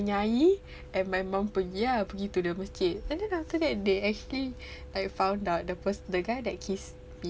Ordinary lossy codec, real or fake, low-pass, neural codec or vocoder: none; real; none; none